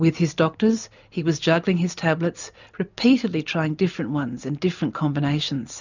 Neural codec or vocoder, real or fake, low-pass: none; real; 7.2 kHz